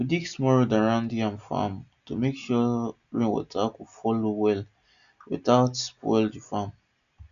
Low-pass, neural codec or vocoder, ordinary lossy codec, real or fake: 7.2 kHz; none; none; real